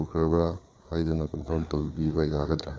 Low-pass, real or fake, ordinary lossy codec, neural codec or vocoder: none; fake; none; codec, 16 kHz, 4 kbps, FreqCodec, larger model